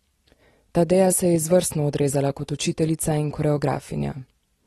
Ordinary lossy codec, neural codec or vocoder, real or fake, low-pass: AAC, 32 kbps; none; real; 19.8 kHz